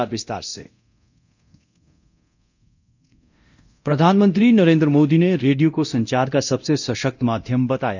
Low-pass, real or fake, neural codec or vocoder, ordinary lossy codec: 7.2 kHz; fake; codec, 24 kHz, 0.9 kbps, DualCodec; none